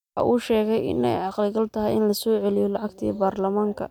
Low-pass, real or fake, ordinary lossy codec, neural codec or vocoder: 19.8 kHz; real; none; none